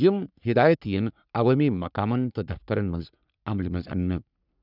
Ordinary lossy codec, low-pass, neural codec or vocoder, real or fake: none; 5.4 kHz; codec, 44.1 kHz, 3.4 kbps, Pupu-Codec; fake